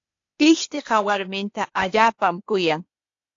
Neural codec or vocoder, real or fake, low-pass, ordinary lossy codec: codec, 16 kHz, 0.8 kbps, ZipCodec; fake; 7.2 kHz; AAC, 48 kbps